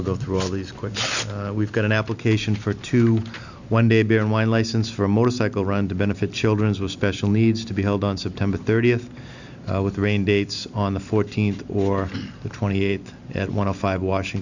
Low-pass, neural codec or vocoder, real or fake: 7.2 kHz; none; real